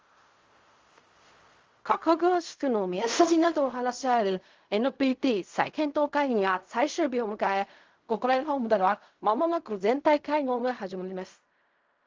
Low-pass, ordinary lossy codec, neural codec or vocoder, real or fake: 7.2 kHz; Opus, 32 kbps; codec, 16 kHz in and 24 kHz out, 0.4 kbps, LongCat-Audio-Codec, fine tuned four codebook decoder; fake